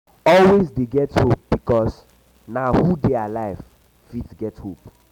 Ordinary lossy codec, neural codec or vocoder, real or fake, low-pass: none; none; real; 19.8 kHz